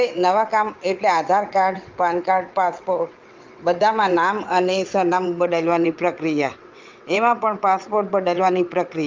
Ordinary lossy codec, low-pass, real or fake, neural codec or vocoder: Opus, 24 kbps; 7.2 kHz; fake; vocoder, 22.05 kHz, 80 mel bands, WaveNeXt